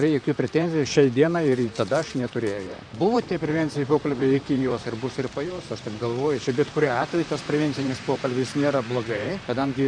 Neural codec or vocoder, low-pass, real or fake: vocoder, 44.1 kHz, 128 mel bands, Pupu-Vocoder; 9.9 kHz; fake